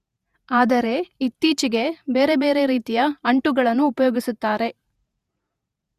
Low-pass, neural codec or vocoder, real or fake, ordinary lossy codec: 14.4 kHz; vocoder, 48 kHz, 128 mel bands, Vocos; fake; Opus, 64 kbps